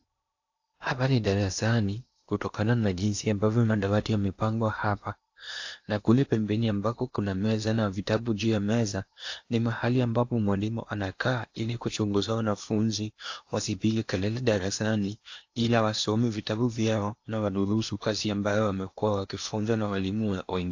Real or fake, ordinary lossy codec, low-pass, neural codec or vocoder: fake; AAC, 48 kbps; 7.2 kHz; codec, 16 kHz in and 24 kHz out, 0.8 kbps, FocalCodec, streaming, 65536 codes